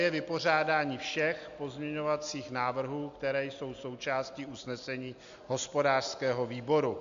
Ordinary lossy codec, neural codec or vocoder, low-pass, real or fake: MP3, 64 kbps; none; 7.2 kHz; real